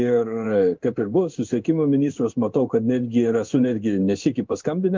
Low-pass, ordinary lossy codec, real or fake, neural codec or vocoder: 7.2 kHz; Opus, 24 kbps; fake; codec, 16 kHz in and 24 kHz out, 1 kbps, XY-Tokenizer